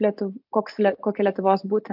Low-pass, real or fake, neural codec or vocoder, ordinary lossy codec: 5.4 kHz; real; none; AAC, 48 kbps